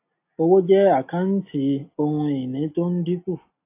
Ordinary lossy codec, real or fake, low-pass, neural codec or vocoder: AAC, 24 kbps; real; 3.6 kHz; none